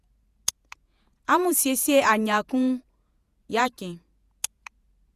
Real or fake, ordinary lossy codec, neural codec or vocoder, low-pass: fake; Opus, 64 kbps; vocoder, 48 kHz, 128 mel bands, Vocos; 14.4 kHz